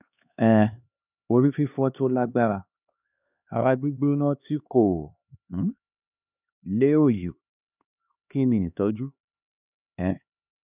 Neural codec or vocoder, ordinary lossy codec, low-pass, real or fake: codec, 16 kHz, 2 kbps, X-Codec, HuBERT features, trained on LibriSpeech; none; 3.6 kHz; fake